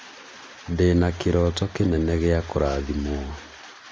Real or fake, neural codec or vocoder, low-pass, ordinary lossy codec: real; none; none; none